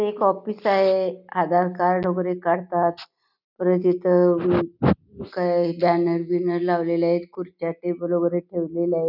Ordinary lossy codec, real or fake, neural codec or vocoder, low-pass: none; real; none; 5.4 kHz